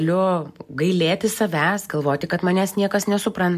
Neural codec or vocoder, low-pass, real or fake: none; 14.4 kHz; real